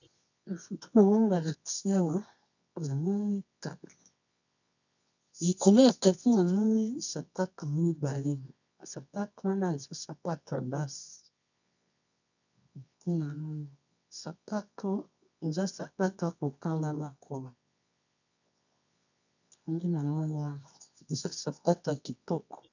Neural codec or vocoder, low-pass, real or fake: codec, 24 kHz, 0.9 kbps, WavTokenizer, medium music audio release; 7.2 kHz; fake